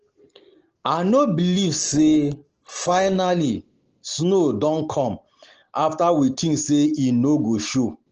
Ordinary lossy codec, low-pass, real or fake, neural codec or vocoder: Opus, 16 kbps; 7.2 kHz; real; none